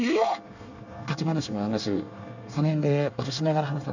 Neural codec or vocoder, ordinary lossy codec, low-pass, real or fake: codec, 24 kHz, 1 kbps, SNAC; none; 7.2 kHz; fake